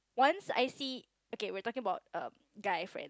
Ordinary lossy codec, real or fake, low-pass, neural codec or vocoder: none; real; none; none